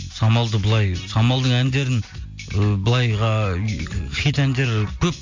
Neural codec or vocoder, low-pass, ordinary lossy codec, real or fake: none; 7.2 kHz; MP3, 48 kbps; real